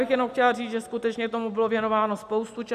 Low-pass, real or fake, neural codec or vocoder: 14.4 kHz; real; none